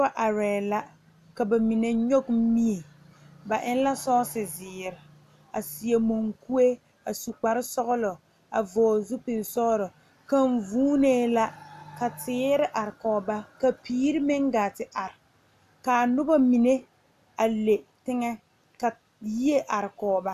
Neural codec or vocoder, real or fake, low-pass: none; real; 14.4 kHz